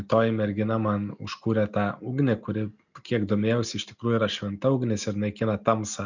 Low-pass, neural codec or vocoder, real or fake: 7.2 kHz; none; real